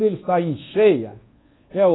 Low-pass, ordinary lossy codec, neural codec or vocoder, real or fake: 7.2 kHz; AAC, 16 kbps; codec, 24 kHz, 1.2 kbps, DualCodec; fake